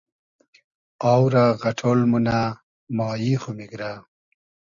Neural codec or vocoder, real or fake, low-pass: none; real; 7.2 kHz